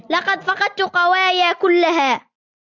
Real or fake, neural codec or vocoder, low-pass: real; none; 7.2 kHz